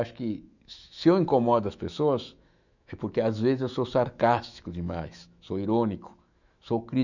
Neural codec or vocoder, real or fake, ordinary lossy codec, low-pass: autoencoder, 48 kHz, 128 numbers a frame, DAC-VAE, trained on Japanese speech; fake; none; 7.2 kHz